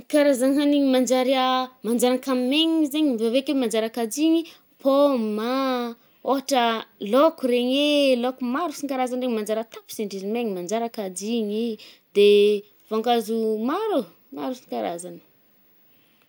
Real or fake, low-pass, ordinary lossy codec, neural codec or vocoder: real; none; none; none